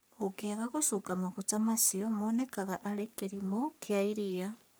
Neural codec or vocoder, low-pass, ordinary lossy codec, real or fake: codec, 44.1 kHz, 3.4 kbps, Pupu-Codec; none; none; fake